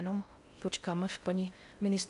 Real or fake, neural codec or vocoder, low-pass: fake; codec, 16 kHz in and 24 kHz out, 0.6 kbps, FocalCodec, streaming, 4096 codes; 10.8 kHz